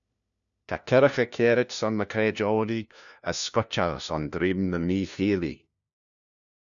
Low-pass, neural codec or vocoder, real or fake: 7.2 kHz; codec, 16 kHz, 1 kbps, FunCodec, trained on LibriTTS, 50 frames a second; fake